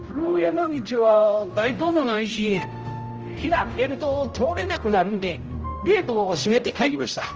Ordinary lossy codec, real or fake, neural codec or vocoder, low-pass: Opus, 24 kbps; fake; codec, 16 kHz, 0.5 kbps, X-Codec, HuBERT features, trained on general audio; 7.2 kHz